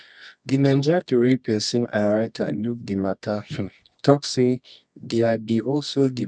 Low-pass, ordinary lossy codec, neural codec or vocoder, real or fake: 9.9 kHz; none; codec, 24 kHz, 0.9 kbps, WavTokenizer, medium music audio release; fake